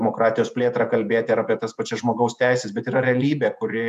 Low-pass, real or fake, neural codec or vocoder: 14.4 kHz; fake; vocoder, 48 kHz, 128 mel bands, Vocos